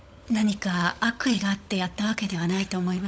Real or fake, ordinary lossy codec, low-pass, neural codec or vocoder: fake; none; none; codec, 16 kHz, 16 kbps, FunCodec, trained on LibriTTS, 50 frames a second